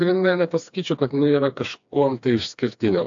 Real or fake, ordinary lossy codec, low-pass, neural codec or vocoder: fake; AAC, 64 kbps; 7.2 kHz; codec, 16 kHz, 2 kbps, FreqCodec, smaller model